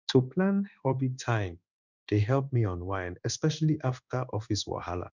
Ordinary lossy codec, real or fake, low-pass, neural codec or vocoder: none; fake; 7.2 kHz; codec, 16 kHz in and 24 kHz out, 1 kbps, XY-Tokenizer